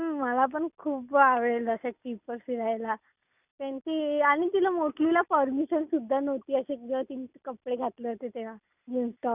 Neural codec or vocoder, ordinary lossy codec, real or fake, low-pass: none; none; real; 3.6 kHz